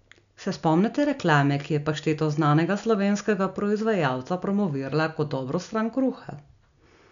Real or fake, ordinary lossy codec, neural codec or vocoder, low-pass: real; none; none; 7.2 kHz